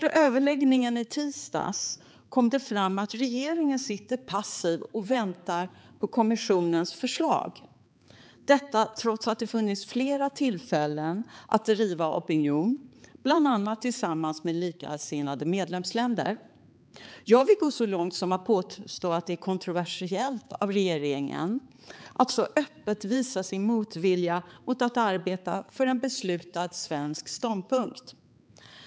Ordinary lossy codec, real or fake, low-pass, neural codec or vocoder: none; fake; none; codec, 16 kHz, 4 kbps, X-Codec, HuBERT features, trained on balanced general audio